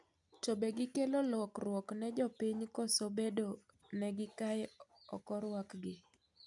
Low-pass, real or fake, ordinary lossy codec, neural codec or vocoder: 10.8 kHz; real; none; none